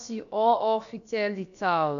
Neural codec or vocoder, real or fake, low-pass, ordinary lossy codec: codec, 16 kHz, about 1 kbps, DyCAST, with the encoder's durations; fake; 7.2 kHz; none